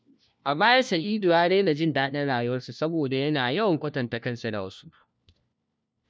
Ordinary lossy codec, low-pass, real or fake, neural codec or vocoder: none; none; fake; codec, 16 kHz, 1 kbps, FunCodec, trained on LibriTTS, 50 frames a second